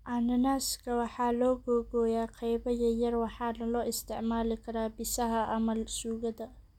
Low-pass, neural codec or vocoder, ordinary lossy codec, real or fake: 19.8 kHz; none; none; real